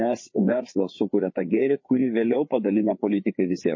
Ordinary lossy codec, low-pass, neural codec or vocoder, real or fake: MP3, 32 kbps; 7.2 kHz; codec, 16 kHz, 4 kbps, FreqCodec, larger model; fake